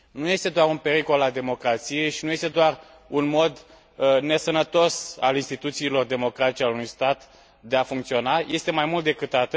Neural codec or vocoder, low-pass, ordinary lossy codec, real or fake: none; none; none; real